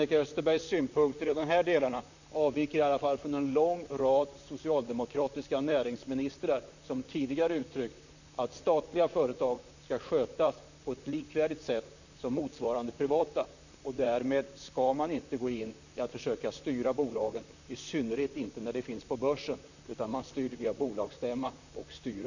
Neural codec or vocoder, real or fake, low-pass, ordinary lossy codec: vocoder, 44.1 kHz, 128 mel bands, Pupu-Vocoder; fake; 7.2 kHz; none